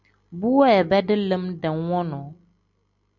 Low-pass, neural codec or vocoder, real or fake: 7.2 kHz; none; real